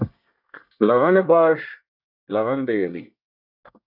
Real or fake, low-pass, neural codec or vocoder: fake; 5.4 kHz; codec, 24 kHz, 1 kbps, SNAC